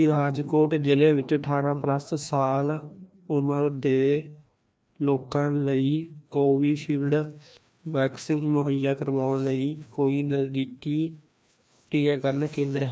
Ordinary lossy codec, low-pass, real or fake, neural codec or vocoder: none; none; fake; codec, 16 kHz, 1 kbps, FreqCodec, larger model